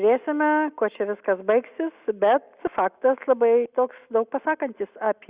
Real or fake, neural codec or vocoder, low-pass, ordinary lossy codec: real; none; 3.6 kHz; Opus, 64 kbps